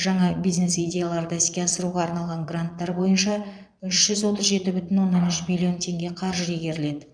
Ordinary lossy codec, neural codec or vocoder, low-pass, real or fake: none; vocoder, 22.05 kHz, 80 mel bands, Vocos; 9.9 kHz; fake